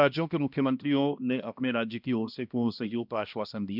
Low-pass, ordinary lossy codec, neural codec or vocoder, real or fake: 5.4 kHz; none; codec, 16 kHz, 1 kbps, X-Codec, HuBERT features, trained on balanced general audio; fake